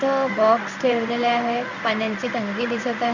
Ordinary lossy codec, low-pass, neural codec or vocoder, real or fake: none; 7.2 kHz; codec, 16 kHz in and 24 kHz out, 1 kbps, XY-Tokenizer; fake